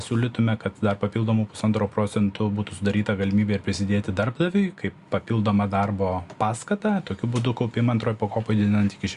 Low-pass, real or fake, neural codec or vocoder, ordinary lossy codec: 10.8 kHz; real; none; Opus, 64 kbps